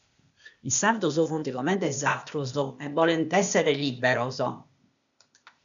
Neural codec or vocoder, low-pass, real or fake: codec, 16 kHz, 0.8 kbps, ZipCodec; 7.2 kHz; fake